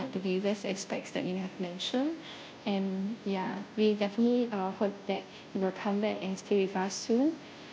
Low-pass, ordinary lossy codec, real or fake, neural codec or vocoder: none; none; fake; codec, 16 kHz, 0.5 kbps, FunCodec, trained on Chinese and English, 25 frames a second